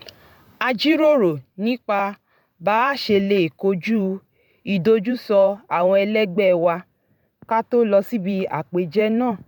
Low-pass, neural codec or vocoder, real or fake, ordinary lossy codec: 19.8 kHz; vocoder, 44.1 kHz, 128 mel bands every 512 samples, BigVGAN v2; fake; none